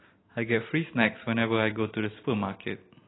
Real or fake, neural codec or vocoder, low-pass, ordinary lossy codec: real; none; 7.2 kHz; AAC, 16 kbps